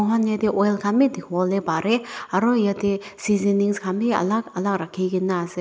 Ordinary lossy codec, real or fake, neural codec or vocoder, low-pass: none; real; none; none